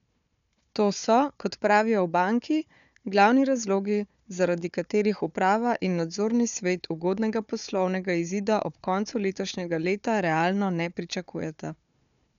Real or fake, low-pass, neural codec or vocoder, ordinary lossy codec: fake; 7.2 kHz; codec, 16 kHz, 4 kbps, FunCodec, trained on Chinese and English, 50 frames a second; none